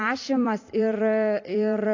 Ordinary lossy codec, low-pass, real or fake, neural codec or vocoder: AAC, 48 kbps; 7.2 kHz; fake; vocoder, 44.1 kHz, 128 mel bands every 256 samples, BigVGAN v2